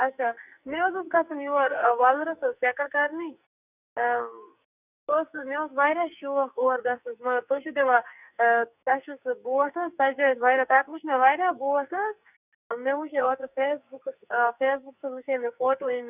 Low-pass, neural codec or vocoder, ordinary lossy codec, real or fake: 3.6 kHz; codec, 44.1 kHz, 2.6 kbps, SNAC; none; fake